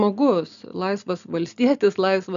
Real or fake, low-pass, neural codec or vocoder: real; 7.2 kHz; none